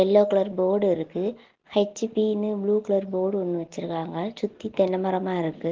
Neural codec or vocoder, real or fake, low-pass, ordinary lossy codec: none; real; 7.2 kHz; Opus, 16 kbps